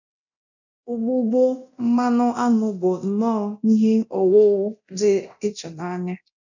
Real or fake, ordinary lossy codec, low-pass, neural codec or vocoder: fake; none; 7.2 kHz; codec, 24 kHz, 0.9 kbps, DualCodec